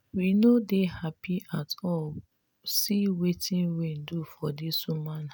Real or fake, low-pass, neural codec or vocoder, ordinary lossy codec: real; none; none; none